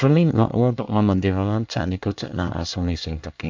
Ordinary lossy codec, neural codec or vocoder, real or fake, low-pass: MP3, 64 kbps; codec, 24 kHz, 1 kbps, SNAC; fake; 7.2 kHz